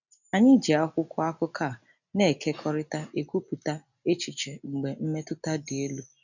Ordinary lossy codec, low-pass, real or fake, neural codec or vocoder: none; 7.2 kHz; real; none